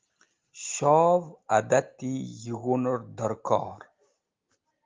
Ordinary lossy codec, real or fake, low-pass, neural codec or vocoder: Opus, 32 kbps; real; 7.2 kHz; none